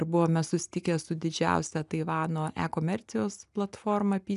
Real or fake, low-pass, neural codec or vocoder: real; 10.8 kHz; none